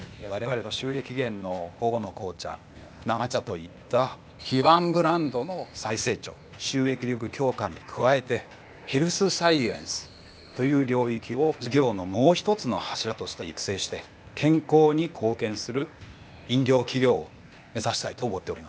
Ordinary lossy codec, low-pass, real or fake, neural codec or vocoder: none; none; fake; codec, 16 kHz, 0.8 kbps, ZipCodec